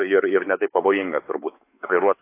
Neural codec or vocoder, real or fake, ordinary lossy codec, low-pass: codec, 16 kHz, 4 kbps, X-Codec, WavLM features, trained on Multilingual LibriSpeech; fake; AAC, 24 kbps; 3.6 kHz